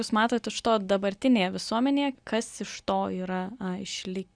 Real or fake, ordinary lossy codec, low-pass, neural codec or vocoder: real; Opus, 64 kbps; 9.9 kHz; none